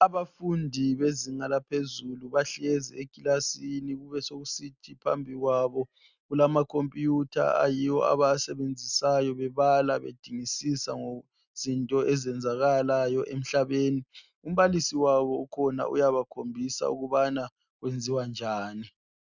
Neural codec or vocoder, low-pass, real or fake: none; 7.2 kHz; real